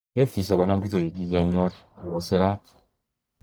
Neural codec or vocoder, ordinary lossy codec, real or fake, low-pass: codec, 44.1 kHz, 1.7 kbps, Pupu-Codec; none; fake; none